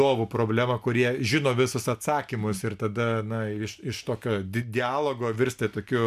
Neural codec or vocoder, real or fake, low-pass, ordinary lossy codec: none; real; 14.4 kHz; MP3, 96 kbps